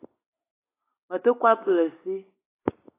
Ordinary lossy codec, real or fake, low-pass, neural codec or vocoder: AAC, 16 kbps; real; 3.6 kHz; none